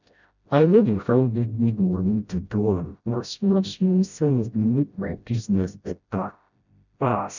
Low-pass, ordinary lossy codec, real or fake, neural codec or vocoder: 7.2 kHz; none; fake; codec, 16 kHz, 0.5 kbps, FreqCodec, smaller model